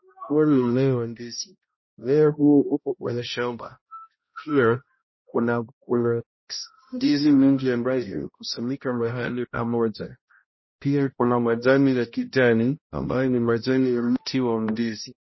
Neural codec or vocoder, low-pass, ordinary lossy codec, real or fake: codec, 16 kHz, 0.5 kbps, X-Codec, HuBERT features, trained on balanced general audio; 7.2 kHz; MP3, 24 kbps; fake